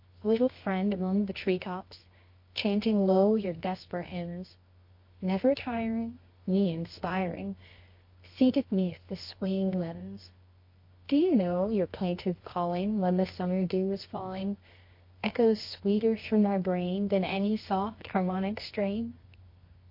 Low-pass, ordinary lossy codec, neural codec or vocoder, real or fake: 5.4 kHz; MP3, 32 kbps; codec, 24 kHz, 0.9 kbps, WavTokenizer, medium music audio release; fake